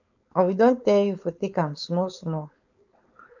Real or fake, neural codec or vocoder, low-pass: fake; codec, 16 kHz, 4.8 kbps, FACodec; 7.2 kHz